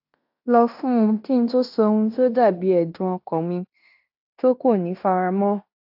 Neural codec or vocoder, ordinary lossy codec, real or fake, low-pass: codec, 16 kHz in and 24 kHz out, 0.9 kbps, LongCat-Audio-Codec, fine tuned four codebook decoder; AAC, 48 kbps; fake; 5.4 kHz